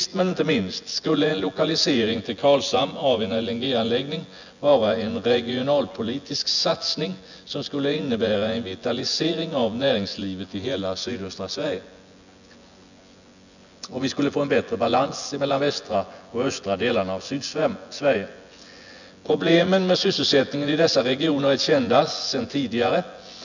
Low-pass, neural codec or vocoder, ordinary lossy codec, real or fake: 7.2 kHz; vocoder, 24 kHz, 100 mel bands, Vocos; none; fake